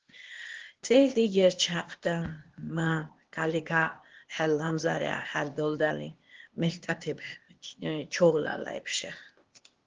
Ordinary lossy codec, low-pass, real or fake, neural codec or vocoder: Opus, 16 kbps; 7.2 kHz; fake; codec, 16 kHz, 0.8 kbps, ZipCodec